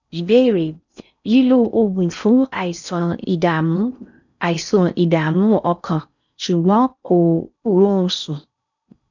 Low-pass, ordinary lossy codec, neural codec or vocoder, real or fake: 7.2 kHz; none; codec, 16 kHz in and 24 kHz out, 0.6 kbps, FocalCodec, streaming, 4096 codes; fake